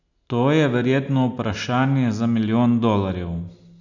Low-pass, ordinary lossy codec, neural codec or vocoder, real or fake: 7.2 kHz; none; none; real